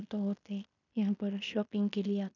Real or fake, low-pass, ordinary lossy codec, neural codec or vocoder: fake; 7.2 kHz; none; codec, 16 kHz in and 24 kHz out, 0.9 kbps, LongCat-Audio-Codec, fine tuned four codebook decoder